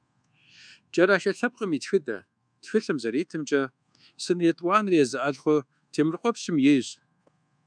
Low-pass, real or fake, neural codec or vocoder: 9.9 kHz; fake; codec, 24 kHz, 1.2 kbps, DualCodec